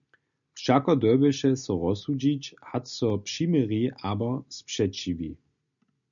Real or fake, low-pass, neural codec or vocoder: real; 7.2 kHz; none